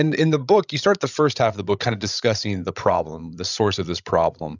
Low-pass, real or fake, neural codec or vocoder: 7.2 kHz; fake; codec, 16 kHz, 16 kbps, FreqCodec, larger model